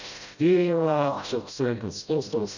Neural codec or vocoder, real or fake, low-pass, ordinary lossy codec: codec, 16 kHz, 0.5 kbps, FreqCodec, smaller model; fake; 7.2 kHz; none